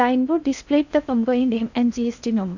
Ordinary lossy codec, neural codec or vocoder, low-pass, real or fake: none; codec, 16 kHz in and 24 kHz out, 0.6 kbps, FocalCodec, streaming, 4096 codes; 7.2 kHz; fake